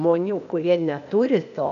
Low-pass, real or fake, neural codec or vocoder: 7.2 kHz; fake; codec, 16 kHz, 0.8 kbps, ZipCodec